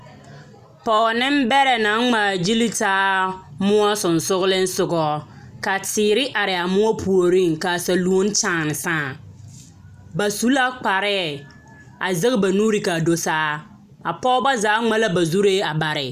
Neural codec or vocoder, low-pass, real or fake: none; 14.4 kHz; real